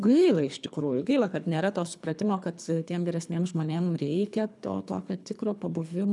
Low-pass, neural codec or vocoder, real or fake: 10.8 kHz; codec, 24 kHz, 3 kbps, HILCodec; fake